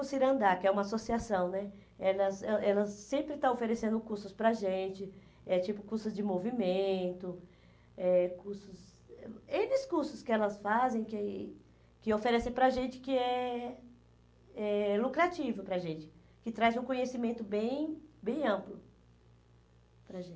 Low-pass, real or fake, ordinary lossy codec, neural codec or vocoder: none; real; none; none